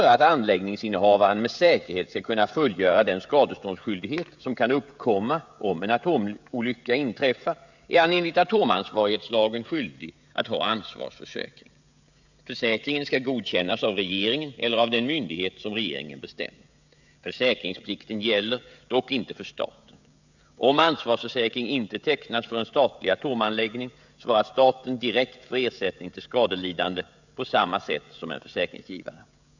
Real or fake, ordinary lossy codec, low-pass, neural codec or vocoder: fake; none; 7.2 kHz; codec, 16 kHz, 16 kbps, FreqCodec, smaller model